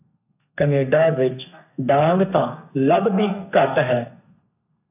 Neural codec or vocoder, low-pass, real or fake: codec, 32 kHz, 1.9 kbps, SNAC; 3.6 kHz; fake